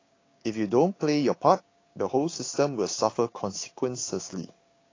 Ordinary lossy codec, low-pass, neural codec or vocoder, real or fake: AAC, 32 kbps; 7.2 kHz; codec, 16 kHz, 6 kbps, DAC; fake